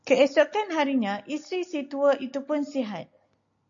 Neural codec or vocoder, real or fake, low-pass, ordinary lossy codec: none; real; 7.2 kHz; MP3, 64 kbps